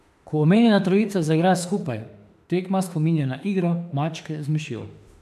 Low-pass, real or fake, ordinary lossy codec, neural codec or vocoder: 14.4 kHz; fake; AAC, 96 kbps; autoencoder, 48 kHz, 32 numbers a frame, DAC-VAE, trained on Japanese speech